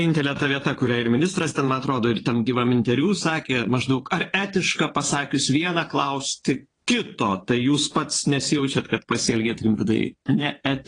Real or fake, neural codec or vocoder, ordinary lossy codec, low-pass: fake; vocoder, 22.05 kHz, 80 mel bands, WaveNeXt; AAC, 32 kbps; 9.9 kHz